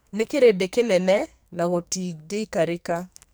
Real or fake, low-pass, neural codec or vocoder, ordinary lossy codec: fake; none; codec, 44.1 kHz, 2.6 kbps, SNAC; none